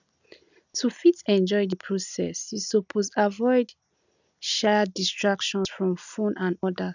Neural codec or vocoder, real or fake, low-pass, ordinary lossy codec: none; real; 7.2 kHz; none